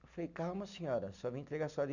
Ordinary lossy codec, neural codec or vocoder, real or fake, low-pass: none; none; real; 7.2 kHz